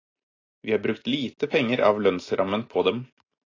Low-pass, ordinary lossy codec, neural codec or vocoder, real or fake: 7.2 kHz; AAC, 32 kbps; none; real